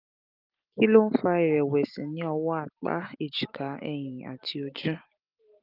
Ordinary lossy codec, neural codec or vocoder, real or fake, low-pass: Opus, 24 kbps; none; real; 5.4 kHz